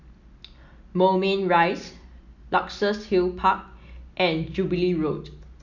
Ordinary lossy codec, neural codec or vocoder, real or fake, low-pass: none; none; real; 7.2 kHz